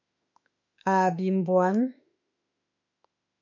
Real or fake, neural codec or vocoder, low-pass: fake; autoencoder, 48 kHz, 32 numbers a frame, DAC-VAE, trained on Japanese speech; 7.2 kHz